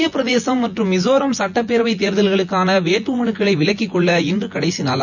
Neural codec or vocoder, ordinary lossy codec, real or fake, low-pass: vocoder, 24 kHz, 100 mel bands, Vocos; none; fake; 7.2 kHz